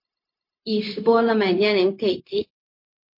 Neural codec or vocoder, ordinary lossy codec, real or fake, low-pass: codec, 16 kHz, 0.4 kbps, LongCat-Audio-Codec; MP3, 32 kbps; fake; 5.4 kHz